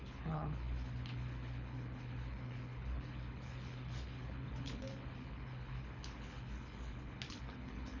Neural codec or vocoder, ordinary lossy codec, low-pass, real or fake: codec, 24 kHz, 6 kbps, HILCodec; none; 7.2 kHz; fake